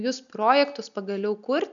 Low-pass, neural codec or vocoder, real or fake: 7.2 kHz; none; real